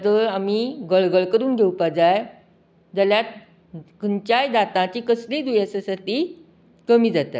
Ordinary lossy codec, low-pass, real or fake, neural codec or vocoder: none; none; real; none